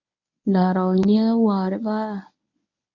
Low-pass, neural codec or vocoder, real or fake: 7.2 kHz; codec, 24 kHz, 0.9 kbps, WavTokenizer, medium speech release version 1; fake